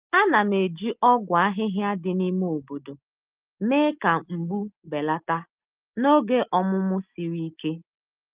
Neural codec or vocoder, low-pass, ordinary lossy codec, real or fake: none; 3.6 kHz; Opus, 24 kbps; real